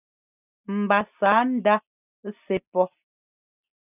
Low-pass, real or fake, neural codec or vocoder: 3.6 kHz; real; none